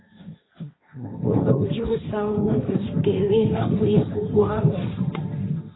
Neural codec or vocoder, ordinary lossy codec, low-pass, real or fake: codec, 16 kHz, 1.1 kbps, Voila-Tokenizer; AAC, 16 kbps; 7.2 kHz; fake